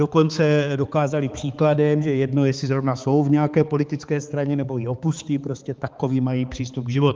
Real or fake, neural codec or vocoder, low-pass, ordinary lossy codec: fake; codec, 16 kHz, 4 kbps, X-Codec, HuBERT features, trained on balanced general audio; 7.2 kHz; Opus, 32 kbps